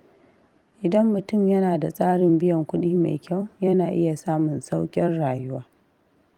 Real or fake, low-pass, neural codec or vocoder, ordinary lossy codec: fake; 19.8 kHz; vocoder, 44.1 kHz, 128 mel bands every 256 samples, BigVGAN v2; Opus, 32 kbps